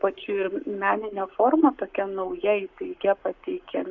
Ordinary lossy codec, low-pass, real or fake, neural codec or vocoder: Opus, 64 kbps; 7.2 kHz; fake; codec, 44.1 kHz, 7.8 kbps, Pupu-Codec